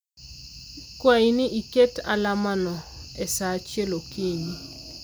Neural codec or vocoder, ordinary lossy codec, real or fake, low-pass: none; none; real; none